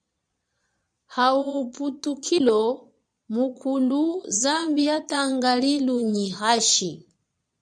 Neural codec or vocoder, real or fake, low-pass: vocoder, 22.05 kHz, 80 mel bands, Vocos; fake; 9.9 kHz